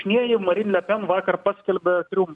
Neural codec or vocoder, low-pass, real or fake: none; 10.8 kHz; real